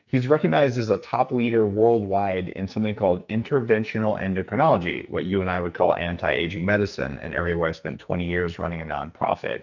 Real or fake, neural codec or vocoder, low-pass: fake; codec, 44.1 kHz, 2.6 kbps, SNAC; 7.2 kHz